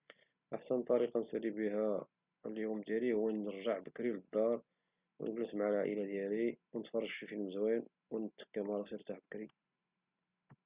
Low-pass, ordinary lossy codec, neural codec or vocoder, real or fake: 3.6 kHz; Opus, 64 kbps; none; real